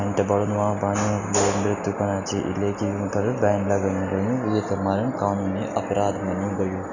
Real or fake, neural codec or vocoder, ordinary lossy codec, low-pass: real; none; none; 7.2 kHz